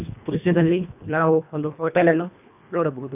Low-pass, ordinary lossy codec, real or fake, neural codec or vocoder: 3.6 kHz; none; fake; codec, 24 kHz, 1.5 kbps, HILCodec